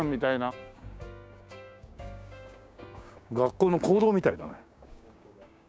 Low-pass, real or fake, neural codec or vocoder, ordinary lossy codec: none; fake; codec, 16 kHz, 6 kbps, DAC; none